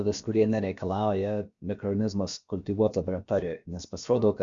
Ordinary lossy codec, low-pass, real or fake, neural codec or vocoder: Opus, 64 kbps; 7.2 kHz; fake; codec, 16 kHz, about 1 kbps, DyCAST, with the encoder's durations